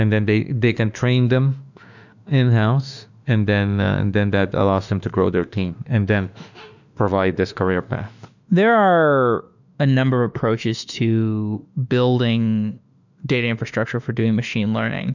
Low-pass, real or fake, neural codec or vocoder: 7.2 kHz; fake; autoencoder, 48 kHz, 32 numbers a frame, DAC-VAE, trained on Japanese speech